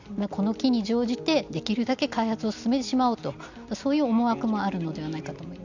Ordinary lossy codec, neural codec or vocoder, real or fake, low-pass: none; none; real; 7.2 kHz